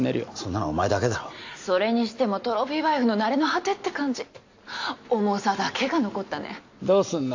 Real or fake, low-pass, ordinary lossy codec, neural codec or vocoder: real; 7.2 kHz; AAC, 48 kbps; none